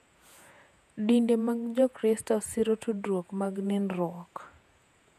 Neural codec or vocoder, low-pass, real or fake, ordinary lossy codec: vocoder, 48 kHz, 128 mel bands, Vocos; 14.4 kHz; fake; none